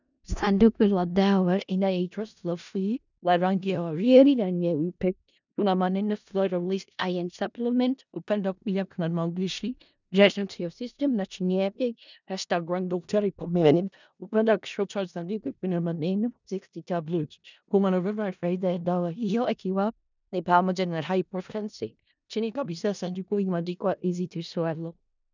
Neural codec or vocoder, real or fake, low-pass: codec, 16 kHz in and 24 kHz out, 0.4 kbps, LongCat-Audio-Codec, four codebook decoder; fake; 7.2 kHz